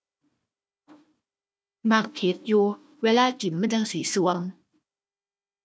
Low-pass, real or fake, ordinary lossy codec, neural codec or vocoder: none; fake; none; codec, 16 kHz, 1 kbps, FunCodec, trained on Chinese and English, 50 frames a second